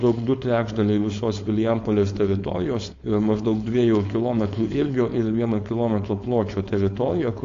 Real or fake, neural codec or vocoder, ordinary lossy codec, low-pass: fake; codec, 16 kHz, 4.8 kbps, FACodec; AAC, 48 kbps; 7.2 kHz